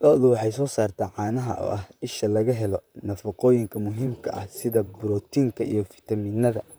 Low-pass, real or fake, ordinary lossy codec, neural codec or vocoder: none; fake; none; vocoder, 44.1 kHz, 128 mel bands, Pupu-Vocoder